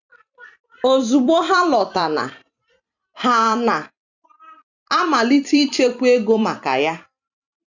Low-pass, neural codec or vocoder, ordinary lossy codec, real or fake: 7.2 kHz; vocoder, 44.1 kHz, 128 mel bands every 256 samples, BigVGAN v2; none; fake